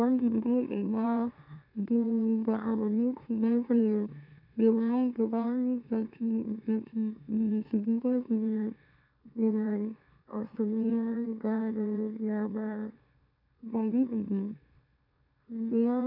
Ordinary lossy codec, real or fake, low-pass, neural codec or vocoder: none; fake; 5.4 kHz; autoencoder, 44.1 kHz, a latent of 192 numbers a frame, MeloTTS